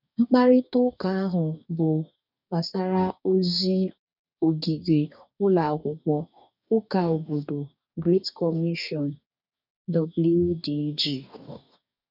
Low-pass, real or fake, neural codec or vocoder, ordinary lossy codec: 5.4 kHz; fake; codec, 44.1 kHz, 2.6 kbps, DAC; none